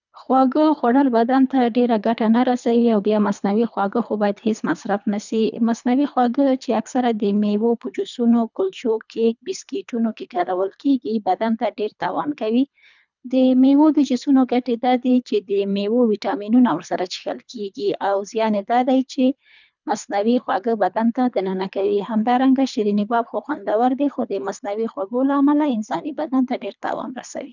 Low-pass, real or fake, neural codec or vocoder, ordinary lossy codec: 7.2 kHz; fake; codec, 24 kHz, 3 kbps, HILCodec; none